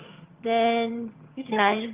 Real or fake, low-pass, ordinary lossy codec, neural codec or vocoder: fake; 3.6 kHz; Opus, 32 kbps; vocoder, 22.05 kHz, 80 mel bands, HiFi-GAN